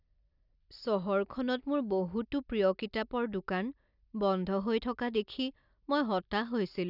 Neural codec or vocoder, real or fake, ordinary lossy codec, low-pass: none; real; none; 5.4 kHz